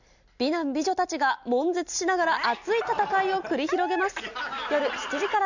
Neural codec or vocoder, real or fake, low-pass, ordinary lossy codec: none; real; 7.2 kHz; none